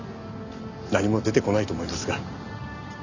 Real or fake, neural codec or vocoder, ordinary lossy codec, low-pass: real; none; none; 7.2 kHz